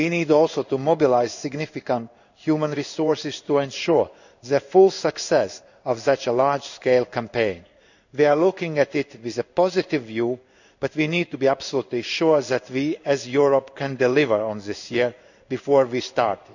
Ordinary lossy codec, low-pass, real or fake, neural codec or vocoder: none; 7.2 kHz; fake; codec, 16 kHz in and 24 kHz out, 1 kbps, XY-Tokenizer